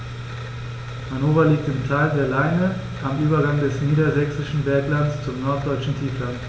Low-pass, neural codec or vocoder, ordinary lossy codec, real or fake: none; none; none; real